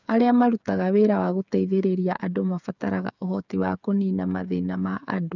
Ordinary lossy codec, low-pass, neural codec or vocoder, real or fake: none; 7.2 kHz; codec, 16 kHz, 8 kbps, FreqCodec, smaller model; fake